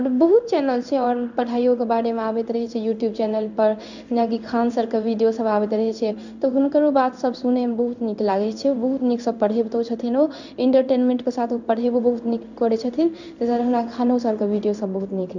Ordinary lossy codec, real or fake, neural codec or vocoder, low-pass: none; fake; codec, 16 kHz in and 24 kHz out, 1 kbps, XY-Tokenizer; 7.2 kHz